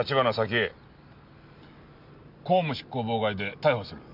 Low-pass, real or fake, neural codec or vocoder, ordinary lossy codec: 5.4 kHz; real; none; none